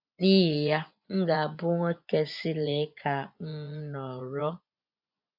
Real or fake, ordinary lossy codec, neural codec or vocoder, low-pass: fake; none; vocoder, 24 kHz, 100 mel bands, Vocos; 5.4 kHz